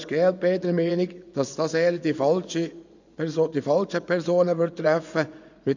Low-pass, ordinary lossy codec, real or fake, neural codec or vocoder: 7.2 kHz; none; fake; vocoder, 24 kHz, 100 mel bands, Vocos